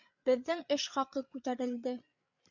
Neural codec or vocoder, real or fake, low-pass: codec, 16 kHz, 8 kbps, FreqCodec, larger model; fake; 7.2 kHz